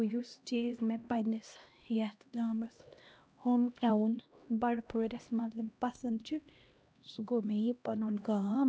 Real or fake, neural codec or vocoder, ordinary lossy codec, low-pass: fake; codec, 16 kHz, 1 kbps, X-Codec, HuBERT features, trained on LibriSpeech; none; none